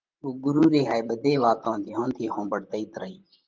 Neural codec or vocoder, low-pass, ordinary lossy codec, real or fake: vocoder, 44.1 kHz, 128 mel bands, Pupu-Vocoder; 7.2 kHz; Opus, 32 kbps; fake